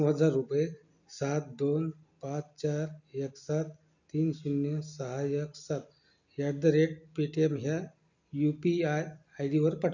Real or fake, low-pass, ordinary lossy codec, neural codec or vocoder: real; 7.2 kHz; none; none